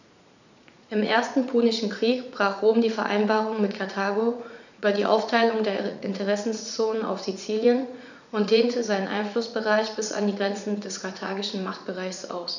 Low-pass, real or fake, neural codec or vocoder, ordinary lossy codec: 7.2 kHz; fake; vocoder, 44.1 kHz, 80 mel bands, Vocos; none